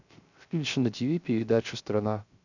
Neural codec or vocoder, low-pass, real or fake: codec, 16 kHz, 0.3 kbps, FocalCodec; 7.2 kHz; fake